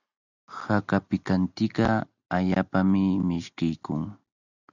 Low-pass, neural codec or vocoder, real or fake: 7.2 kHz; none; real